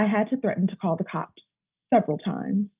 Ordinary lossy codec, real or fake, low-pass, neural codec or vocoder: Opus, 24 kbps; real; 3.6 kHz; none